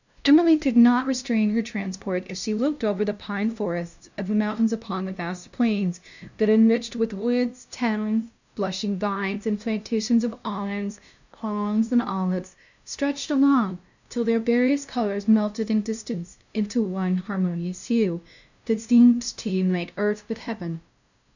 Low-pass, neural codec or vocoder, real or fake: 7.2 kHz; codec, 16 kHz, 0.5 kbps, FunCodec, trained on LibriTTS, 25 frames a second; fake